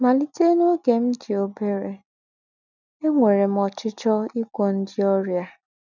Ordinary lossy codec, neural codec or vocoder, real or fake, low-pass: none; none; real; 7.2 kHz